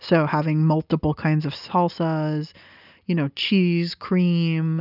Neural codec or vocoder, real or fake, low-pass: none; real; 5.4 kHz